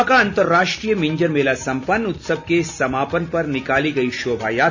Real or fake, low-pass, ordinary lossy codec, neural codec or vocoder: real; 7.2 kHz; none; none